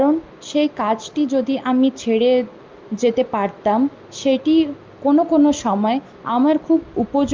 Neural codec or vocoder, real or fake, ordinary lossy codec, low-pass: none; real; Opus, 24 kbps; 7.2 kHz